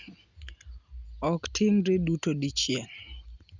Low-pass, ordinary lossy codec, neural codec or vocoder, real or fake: 7.2 kHz; Opus, 64 kbps; none; real